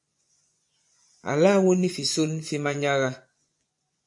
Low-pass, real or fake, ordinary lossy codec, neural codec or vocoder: 10.8 kHz; fake; AAC, 64 kbps; vocoder, 24 kHz, 100 mel bands, Vocos